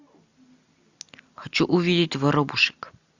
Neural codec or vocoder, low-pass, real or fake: none; 7.2 kHz; real